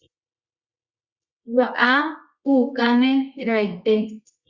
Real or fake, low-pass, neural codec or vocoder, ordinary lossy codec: fake; 7.2 kHz; codec, 24 kHz, 0.9 kbps, WavTokenizer, medium music audio release; Opus, 64 kbps